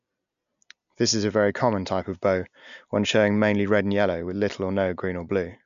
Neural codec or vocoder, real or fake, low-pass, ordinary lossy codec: none; real; 7.2 kHz; none